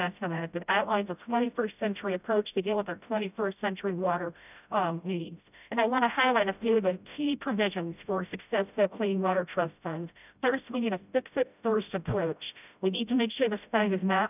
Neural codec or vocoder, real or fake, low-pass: codec, 16 kHz, 0.5 kbps, FreqCodec, smaller model; fake; 3.6 kHz